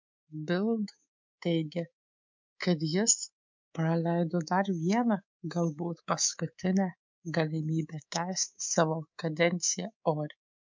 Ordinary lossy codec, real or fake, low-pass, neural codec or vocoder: MP3, 64 kbps; fake; 7.2 kHz; codec, 24 kHz, 3.1 kbps, DualCodec